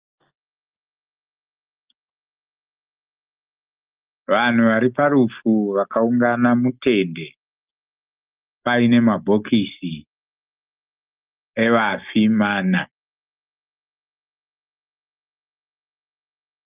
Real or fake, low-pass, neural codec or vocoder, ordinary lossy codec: real; 3.6 kHz; none; Opus, 24 kbps